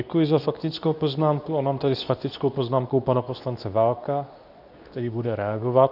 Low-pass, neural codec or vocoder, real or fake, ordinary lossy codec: 5.4 kHz; codec, 24 kHz, 0.9 kbps, WavTokenizer, medium speech release version 2; fake; AAC, 48 kbps